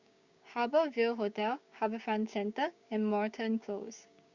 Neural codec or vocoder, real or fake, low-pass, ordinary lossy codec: codec, 16 kHz, 6 kbps, DAC; fake; 7.2 kHz; Opus, 64 kbps